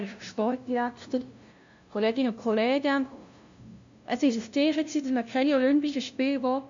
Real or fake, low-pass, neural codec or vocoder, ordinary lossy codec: fake; 7.2 kHz; codec, 16 kHz, 0.5 kbps, FunCodec, trained on LibriTTS, 25 frames a second; MP3, 64 kbps